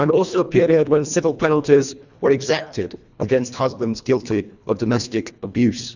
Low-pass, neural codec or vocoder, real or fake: 7.2 kHz; codec, 24 kHz, 1.5 kbps, HILCodec; fake